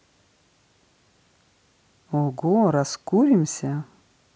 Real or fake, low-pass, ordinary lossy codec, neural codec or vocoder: real; none; none; none